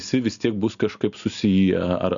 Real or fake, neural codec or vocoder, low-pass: real; none; 7.2 kHz